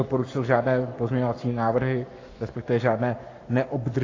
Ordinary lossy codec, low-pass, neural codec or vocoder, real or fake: AAC, 32 kbps; 7.2 kHz; codec, 44.1 kHz, 7.8 kbps, Pupu-Codec; fake